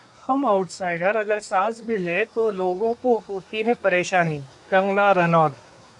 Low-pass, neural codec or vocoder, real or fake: 10.8 kHz; codec, 24 kHz, 1 kbps, SNAC; fake